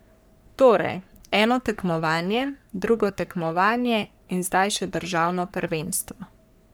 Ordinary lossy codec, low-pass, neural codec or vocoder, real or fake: none; none; codec, 44.1 kHz, 3.4 kbps, Pupu-Codec; fake